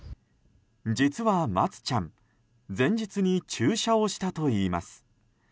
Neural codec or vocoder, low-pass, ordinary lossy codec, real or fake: none; none; none; real